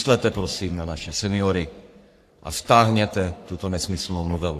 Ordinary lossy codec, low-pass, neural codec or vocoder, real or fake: AAC, 64 kbps; 14.4 kHz; codec, 44.1 kHz, 3.4 kbps, Pupu-Codec; fake